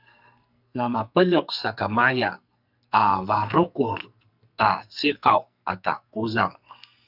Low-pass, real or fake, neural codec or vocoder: 5.4 kHz; fake; codec, 44.1 kHz, 2.6 kbps, SNAC